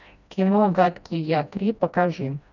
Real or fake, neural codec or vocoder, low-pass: fake; codec, 16 kHz, 1 kbps, FreqCodec, smaller model; 7.2 kHz